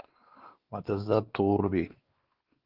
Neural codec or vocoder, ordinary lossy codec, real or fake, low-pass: codec, 16 kHz, 2 kbps, X-Codec, WavLM features, trained on Multilingual LibriSpeech; Opus, 16 kbps; fake; 5.4 kHz